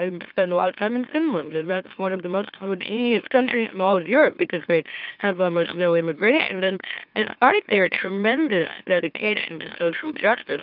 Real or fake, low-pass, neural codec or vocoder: fake; 5.4 kHz; autoencoder, 44.1 kHz, a latent of 192 numbers a frame, MeloTTS